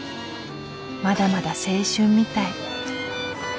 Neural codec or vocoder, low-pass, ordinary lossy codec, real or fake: none; none; none; real